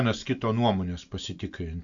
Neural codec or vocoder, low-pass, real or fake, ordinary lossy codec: none; 7.2 kHz; real; AAC, 64 kbps